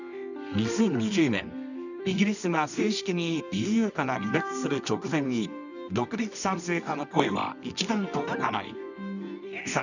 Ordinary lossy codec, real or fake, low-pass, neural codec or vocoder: none; fake; 7.2 kHz; codec, 24 kHz, 0.9 kbps, WavTokenizer, medium music audio release